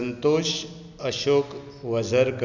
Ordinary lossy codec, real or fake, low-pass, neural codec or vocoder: none; real; 7.2 kHz; none